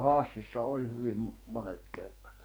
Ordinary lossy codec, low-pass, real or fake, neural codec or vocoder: none; none; fake; codec, 44.1 kHz, 2.6 kbps, SNAC